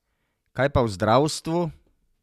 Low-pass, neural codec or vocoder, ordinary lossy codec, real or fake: 14.4 kHz; none; none; real